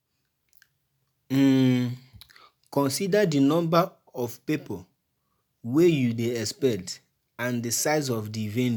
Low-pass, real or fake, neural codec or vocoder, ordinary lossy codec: none; real; none; none